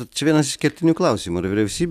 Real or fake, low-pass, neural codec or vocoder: real; 14.4 kHz; none